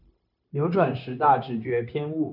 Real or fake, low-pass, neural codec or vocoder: fake; 5.4 kHz; codec, 16 kHz, 0.9 kbps, LongCat-Audio-Codec